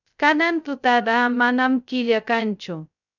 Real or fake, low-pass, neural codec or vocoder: fake; 7.2 kHz; codec, 16 kHz, 0.2 kbps, FocalCodec